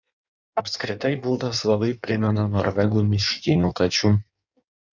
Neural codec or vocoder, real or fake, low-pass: codec, 16 kHz in and 24 kHz out, 1.1 kbps, FireRedTTS-2 codec; fake; 7.2 kHz